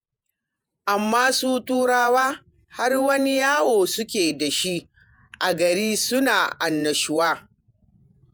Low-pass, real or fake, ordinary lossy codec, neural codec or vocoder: none; fake; none; vocoder, 48 kHz, 128 mel bands, Vocos